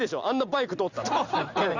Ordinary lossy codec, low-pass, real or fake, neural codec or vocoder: Opus, 64 kbps; 7.2 kHz; real; none